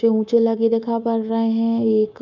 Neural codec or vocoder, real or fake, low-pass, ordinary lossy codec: codec, 24 kHz, 3.1 kbps, DualCodec; fake; 7.2 kHz; none